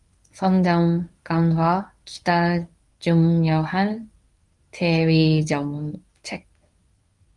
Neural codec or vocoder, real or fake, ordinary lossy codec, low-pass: codec, 24 kHz, 0.9 kbps, WavTokenizer, medium speech release version 1; fake; Opus, 32 kbps; 10.8 kHz